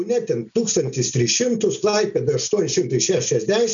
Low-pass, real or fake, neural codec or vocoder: 7.2 kHz; real; none